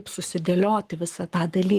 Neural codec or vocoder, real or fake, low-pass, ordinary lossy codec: codec, 44.1 kHz, 7.8 kbps, Pupu-Codec; fake; 14.4 kHz; Opus, 24 kbps